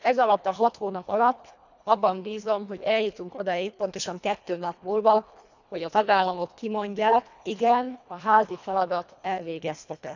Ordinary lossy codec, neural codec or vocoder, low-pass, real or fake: none; codec, 24 kHz, 1.5 kbps, HILCodec; 7.2 kHz; fake